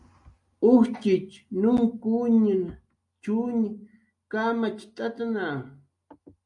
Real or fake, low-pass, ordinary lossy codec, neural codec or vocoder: real; 10.8 kHz; MP3, 64 kbps; none